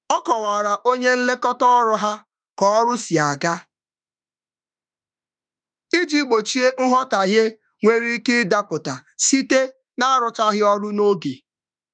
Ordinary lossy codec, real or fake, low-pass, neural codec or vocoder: none; fake; 9.9 kHz; autoencoder, 48 kHz, 32 numbers a frame, DAC-VAE, trained on Japanese speech